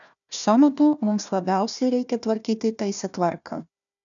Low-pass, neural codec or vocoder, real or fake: 7.2 kHz; codec, 16 kHz, 1 kbps, FunCodec, trained on Chinese and English, 50 frames a second; fake